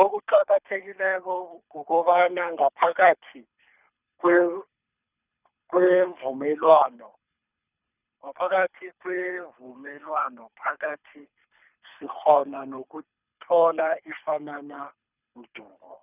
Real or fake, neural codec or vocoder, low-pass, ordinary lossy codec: fake; codec, 24 kHz, 3 kbps, HILCodec; 3.6 kHz; none